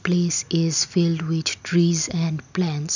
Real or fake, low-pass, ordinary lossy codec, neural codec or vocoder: real; 7.2 kHz; none; none